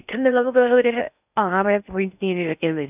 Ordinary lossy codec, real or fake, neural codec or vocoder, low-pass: none; fake; codec, 16 kHz in and 24 kHz out, 0.6 kbps, FocalCodec, streaming, 4096 codes; 3.6 kHz